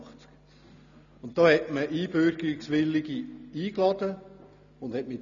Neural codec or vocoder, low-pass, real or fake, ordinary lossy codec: none; 7.2 kHz; real; none